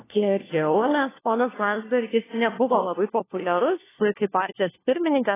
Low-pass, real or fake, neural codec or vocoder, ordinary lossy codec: 3.6 kHz; fake; codec, 16 kHz, 1 kbps, FunCodec, trained on LibriTTS, 50 frames a second; AAC, 16 kbps